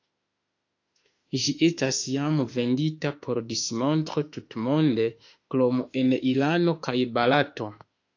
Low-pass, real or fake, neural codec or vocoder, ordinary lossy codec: 7.2 kHz; fake; autoencoder, 48 kHz, 32 numbers a frame, DAC-VAE, trained on Japanese speech; AAC, 48 kbps